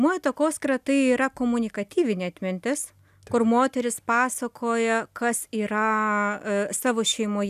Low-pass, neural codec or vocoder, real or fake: 14.4 kHz; none; real